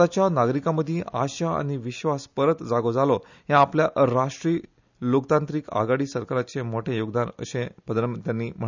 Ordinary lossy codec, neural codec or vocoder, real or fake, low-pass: none; none; real; 7.2 kHz